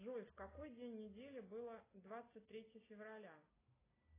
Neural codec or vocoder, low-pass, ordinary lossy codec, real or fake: none; 3.6 kHz; AAC, 16 kbps; real